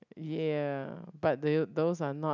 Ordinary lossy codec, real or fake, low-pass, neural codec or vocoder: none; real; 7.2 kHz; none